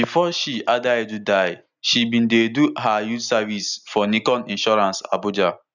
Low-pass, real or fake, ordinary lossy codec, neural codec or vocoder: 7.2 kHz; real; none; none